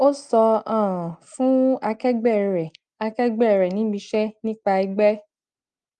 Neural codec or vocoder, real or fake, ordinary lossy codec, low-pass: none; real; Opus, 32 kbps; 9.9 kHz